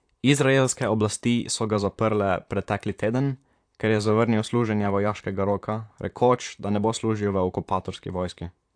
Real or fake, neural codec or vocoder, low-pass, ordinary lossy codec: fake; vocoder, 44.1 kHz, 128 mel bands, Pupu-Vocoder; 9.9 kHz; AAC, 64 kbps